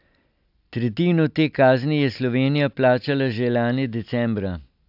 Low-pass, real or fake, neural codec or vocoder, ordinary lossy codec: 5.4 kHz; real; none; AAC, 48 kbps